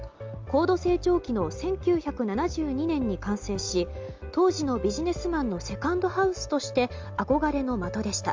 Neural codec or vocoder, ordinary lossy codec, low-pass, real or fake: none; Opus, 32 kbps; 7.2 kHz; real